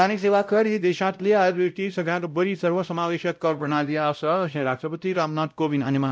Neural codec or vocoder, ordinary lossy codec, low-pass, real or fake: codec, 16 kHz, 0.5 kbps, X-Codec, WavLM features, trained on Multilingual LibriSpeech; none; none; fake